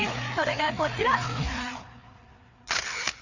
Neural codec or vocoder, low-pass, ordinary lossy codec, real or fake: codec, 16 kHz, 4 kbps, FreqCodec, larger model; 7.2 kHz; none; fake